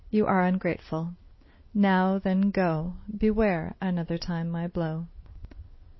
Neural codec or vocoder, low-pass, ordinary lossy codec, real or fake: none; 7.2 kHz; MP3, 24 kbps; real